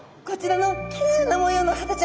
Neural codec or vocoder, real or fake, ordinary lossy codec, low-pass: none; real; none; none